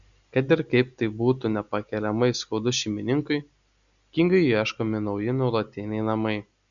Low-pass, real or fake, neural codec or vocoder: 7.2 kHz; real; none